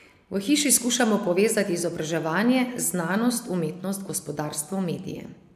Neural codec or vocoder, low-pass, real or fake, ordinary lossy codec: none; 14.4 kHz; real; AAC, 96 kbps